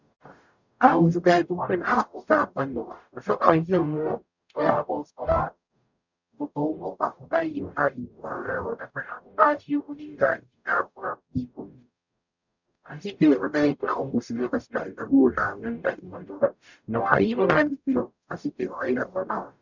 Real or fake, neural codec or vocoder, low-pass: fake; codec, 44.1 kHz, 0.9 kbps, DAC; 7.2 kHz